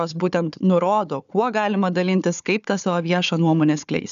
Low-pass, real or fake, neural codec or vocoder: 7.2 kHz; fake; codec, 16 kHz, 4 kbps, FunCodec, trained on Chinese and English, 50 frames a second